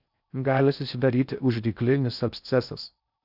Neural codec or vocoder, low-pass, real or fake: codec, 16 kHz in and 24 kHz out, 0.6 kbps, FocalCodec, streaming, 4096 codes; 5.4 kHz; fake